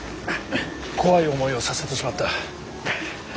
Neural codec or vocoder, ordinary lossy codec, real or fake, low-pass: none; none; real; none